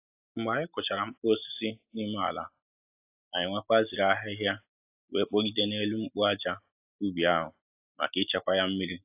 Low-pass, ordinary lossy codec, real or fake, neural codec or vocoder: 3.6 kHz; AAC, 32 kbps; real; none